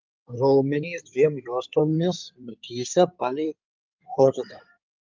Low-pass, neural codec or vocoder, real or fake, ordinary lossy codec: 7.2 kHz; codec, 16 kHz in and 24 kHz out, 2.2 kbps, FireRedTTS-2 codec; fake; Opus, 24 kbps